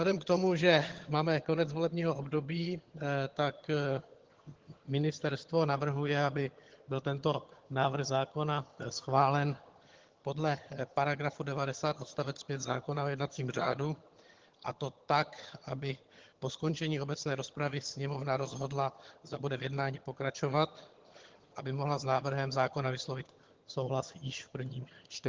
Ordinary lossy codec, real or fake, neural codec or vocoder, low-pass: Opus, 16 kbps; fake; vocoder, 22.05 kHz, 80 mel bands, HiFi-GAN; 7.2 kHz